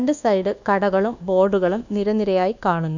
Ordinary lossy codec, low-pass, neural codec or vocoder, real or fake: none; 7.2 kHz; codec, 24 kHz, 1.2 kbps, DualCodec; fake